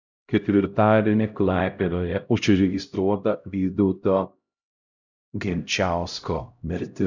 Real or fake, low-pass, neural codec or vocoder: fake; 7.2 kHz; codec, 16 kHz, 0.5 kbps, X-Codec, HuBERT features, trained on LibriSpeech